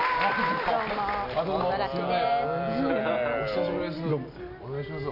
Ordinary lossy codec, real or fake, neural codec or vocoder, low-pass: none; real; none; 5.4 kHz